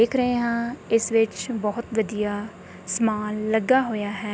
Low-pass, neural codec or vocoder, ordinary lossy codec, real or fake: none; none; none; real